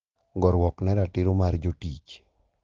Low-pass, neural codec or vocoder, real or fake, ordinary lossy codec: 7.2 kHz; none; real; Opus, 16 kbps